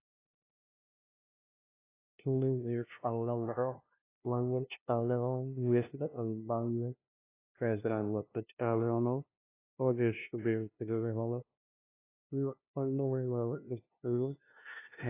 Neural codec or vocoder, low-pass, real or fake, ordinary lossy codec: codec, 16 kHz, 0.5 kbps, FunCodec, trained on LibriTTS, 25 frames a second; 3.6 kHz; fake; AAC, 24 kbps